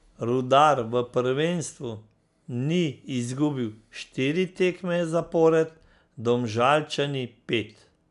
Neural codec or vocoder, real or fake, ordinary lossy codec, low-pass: none; real; none; 10.8 kHz